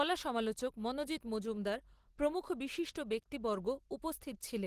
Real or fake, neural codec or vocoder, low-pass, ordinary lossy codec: real; none; 14.4 kHz; Opus, 16 kbps